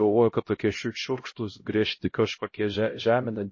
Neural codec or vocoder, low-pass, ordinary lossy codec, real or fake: codec, 16 kHz, 0.5 kbps, X-Codec, HuBERT features, trained on LibriSpeech; 7.2 kHz; MP3, 32 kbps; fake